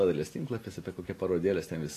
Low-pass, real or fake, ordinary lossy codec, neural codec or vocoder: 14.4 kHz; real; AAC, 48 kbps; none